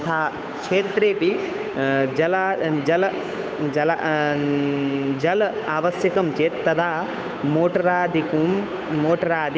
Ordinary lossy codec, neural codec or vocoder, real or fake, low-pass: none; codec, 16 kHz, 8 kbps, FunCodec, trained on Chinese and English, 25 frames a second; fake; none